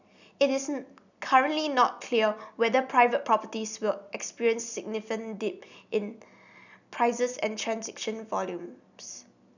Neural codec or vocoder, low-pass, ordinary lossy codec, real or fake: none; 7.2 kHz; none; real